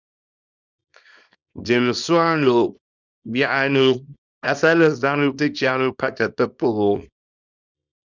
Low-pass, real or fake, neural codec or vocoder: 7.2 kHz; fake; codec, 24 kHz, 0.9 kbps, WavTokenizer, small release